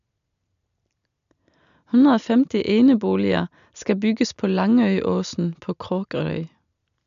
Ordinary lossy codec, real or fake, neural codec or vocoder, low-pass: AAC, 96 kbps; real; none; 7.2 kHz